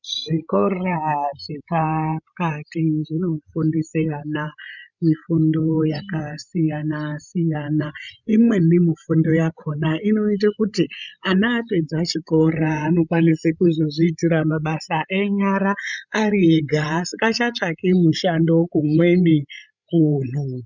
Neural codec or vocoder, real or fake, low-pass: codec, 16 kHz, 16 kbps, FreqCodec, larger model; fake; 7.2 kHz